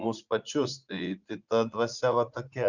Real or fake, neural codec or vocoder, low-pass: fake; vocoder, 44.1 kHz, 80 mel bands, Vocos; 7.2 kHz